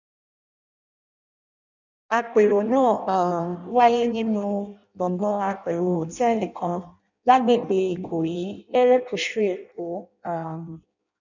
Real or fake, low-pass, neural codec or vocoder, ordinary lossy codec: fake; 7.2 kHz; codec, 16 kHz in and 24 kHz out, 0.6 kbps, FireRedTTS-2 codec; none